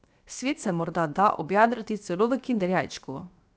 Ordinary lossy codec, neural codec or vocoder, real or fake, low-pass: none; codec, 16 kHz, 0.7 kbps, FocalCodec; fake; none